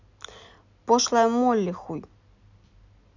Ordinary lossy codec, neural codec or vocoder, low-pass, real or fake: none; none; 7.2 kHz; real